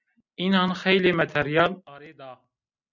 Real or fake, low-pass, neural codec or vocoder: real; 7.2 kHz; none